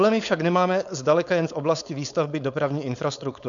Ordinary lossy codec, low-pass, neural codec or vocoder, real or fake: MP3, 96 kbps; 7.2 kHz; codec, 16 kHz, 4.8 kbps, FACodec; fake